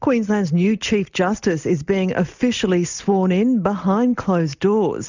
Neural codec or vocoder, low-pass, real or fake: none; 7.2 kHz; real